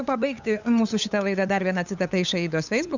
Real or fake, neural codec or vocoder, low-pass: fake; codec, 16 kHz, 8 kbps, FunCodec, trained on LibriTTS, 25 frames a second; 7.2 kHz